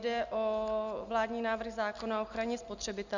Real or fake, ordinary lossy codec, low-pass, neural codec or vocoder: real; Opus, 64 kbps; 7.2 kHz; none